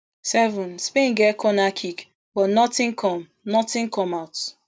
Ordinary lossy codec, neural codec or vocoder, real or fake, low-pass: none; none; real; none